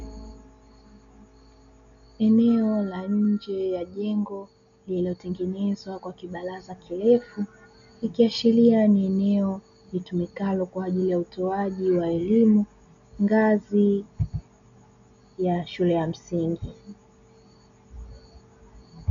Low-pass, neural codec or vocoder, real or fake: 7.2 kHz; none; real